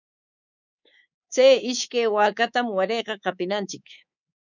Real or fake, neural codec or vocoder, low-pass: fake; codec, 24 kHz, 3.1 kbps, DualCodec; 7.2 kHz